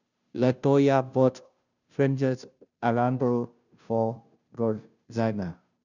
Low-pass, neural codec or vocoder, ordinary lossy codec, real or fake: 7.2 kHz; codec, 16 kHz, 0.5 kbps, FunCodec, trained on Chinese and English, 25 frames a second; none; fake